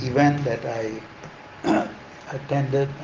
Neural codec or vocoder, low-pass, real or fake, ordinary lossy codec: none; 7.2 kHz; real; Opus, 16 kbps